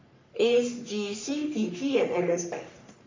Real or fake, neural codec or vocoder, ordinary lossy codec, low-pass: fake; codec, 44.1 kHz, 3.4 kbps, Pupu-Codec; MP3, 48 kbps; 7.2 kHz